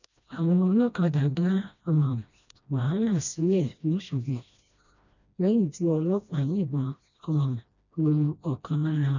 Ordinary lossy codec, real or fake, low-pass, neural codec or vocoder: none; fake; 7.2 kHz; codec, 16 kHz, 1 kbps, FreqCodec, smaller model